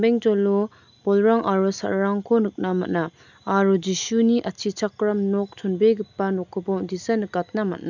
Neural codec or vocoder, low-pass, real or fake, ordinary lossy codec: none; 7.2 kHz; real; none